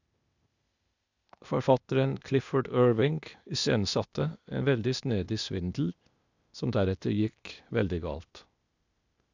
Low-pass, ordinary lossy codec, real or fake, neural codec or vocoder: 7.2 kHz; none; fake; codec, 16 kHz, 0.8 kbps, ZipCodec